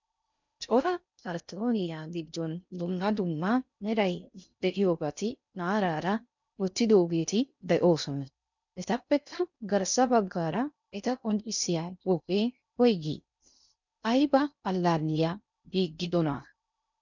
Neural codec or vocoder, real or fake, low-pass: codec, 16 kHz in and 24 kHz out, 0.6 kbps, FocalCodec, streaming, 4096 codes; fake; 7.2 kHz